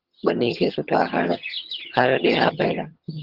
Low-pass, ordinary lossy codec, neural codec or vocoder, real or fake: 5.4 kHz; Opus, 16 kbps; vocoder, 22.05 kHz, 80 mel bands, HiFi-GAN; fake